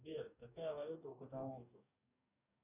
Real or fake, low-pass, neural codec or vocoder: fake; 3.6 kHz; codec, 44.1 kHz, 2.6 kbps, DAC